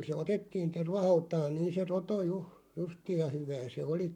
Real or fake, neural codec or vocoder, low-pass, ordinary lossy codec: fake; codec, 44.1 kHz, 7.8 kbps, Pupu-Codec; 19.8 kHz; none